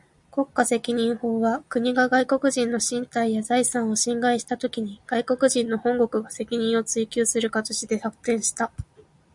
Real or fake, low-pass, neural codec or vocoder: real; 10.8 kHz; none